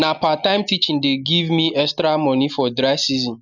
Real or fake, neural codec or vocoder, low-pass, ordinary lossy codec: real; none; 7.2 kHz; none